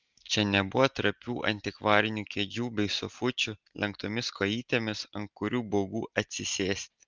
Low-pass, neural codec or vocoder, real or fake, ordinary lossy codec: 7.2 kHz; none; real; Opus, 24 kbps